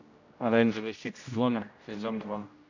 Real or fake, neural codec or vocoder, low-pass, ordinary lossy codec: fake; codec, 16 kHz, 0.5 kbps, X-Codec, HuBERT features, trained on general audio; 7.2 kHz; AAC, 48 kbps